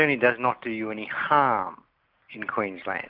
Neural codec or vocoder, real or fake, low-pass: none; real; 5.4 kHz